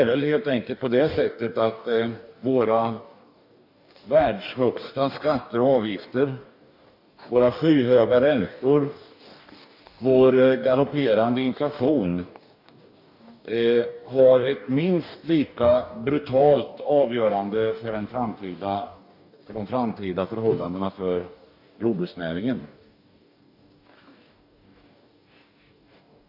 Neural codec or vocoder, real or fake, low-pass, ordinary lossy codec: codec, 44.1 kHz, 2.6 kbps, DAC; fake; 5.4 kHz; none